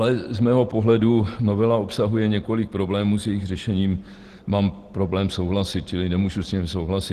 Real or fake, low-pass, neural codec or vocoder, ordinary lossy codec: real; 14.4 kHz; none; Opus, 16 kbps